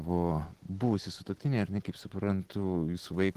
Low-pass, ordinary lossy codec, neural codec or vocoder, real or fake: 14.4 kHz; Opus, 16 kbps; none; real